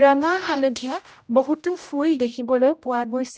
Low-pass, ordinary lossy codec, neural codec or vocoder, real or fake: none; none; codec, 16 kHz, 0.5 kbps, X-Codec, HuBERT features, trained on general audio; fake